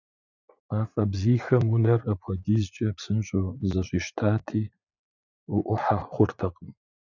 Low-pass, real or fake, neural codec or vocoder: 7.2 kHz; fake; vocoder, 44.1 kHz, 80 mel bands, Vocos